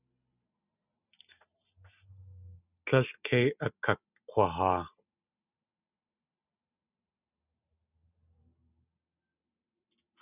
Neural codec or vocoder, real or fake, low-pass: none; real; 3.6 kHz